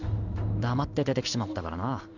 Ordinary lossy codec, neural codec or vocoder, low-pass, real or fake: none; codec, 16 kHz in and 24 kHz out, 1 kbps, XY-Tokenizer; 7.2 kHz; fake